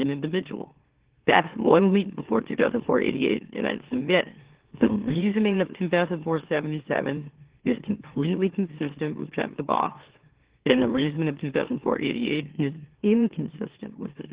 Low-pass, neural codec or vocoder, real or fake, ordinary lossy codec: 3.6 kHz; autoencoder, 44.1 kHz, a latent of 192 numbers a frame, MeloTTS; fake; Opus, 16 kbps